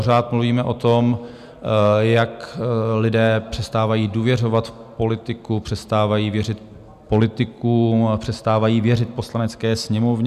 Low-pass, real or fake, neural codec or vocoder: 14.4 kHz; real; none